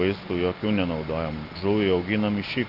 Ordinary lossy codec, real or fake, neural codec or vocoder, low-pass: Opus, 32 kbps; real; none; 5.4 kHz